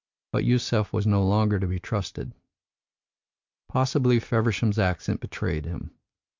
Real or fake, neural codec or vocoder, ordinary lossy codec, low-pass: real; none; MP3, 64 kbps; 7.2 kHz